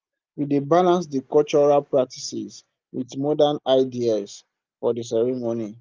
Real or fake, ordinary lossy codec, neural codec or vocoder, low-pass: real; Opus, 24 kbps; none; 7.2 kHz